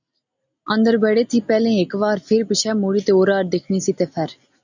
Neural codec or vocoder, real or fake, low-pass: none; real; 7.2 kHz